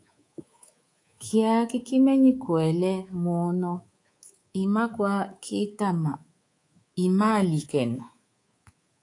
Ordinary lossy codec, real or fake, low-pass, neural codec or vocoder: AAC, 48 kbps; fake; 10.8 kHz; codec, 24 kHz, 3.1 kbps, DualCodec